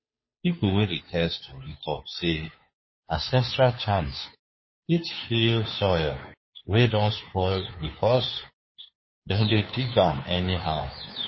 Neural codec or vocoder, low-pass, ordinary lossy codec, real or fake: codec, 16 kHz, 2 kbps, FunCodec, trained on Chinese and English, 25 frames a second; 7.2 kHz; MP3, 24 kbps; fake